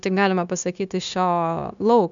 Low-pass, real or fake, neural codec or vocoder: 7.2 kHz; fake; codec, 16 kHz, 0.9 kbps, LongCat-Audio-Codec